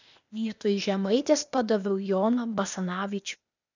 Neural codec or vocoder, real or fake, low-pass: codec, 16 kHz, 0.8 kbps, ZipCodec; fake; 7.2 kHz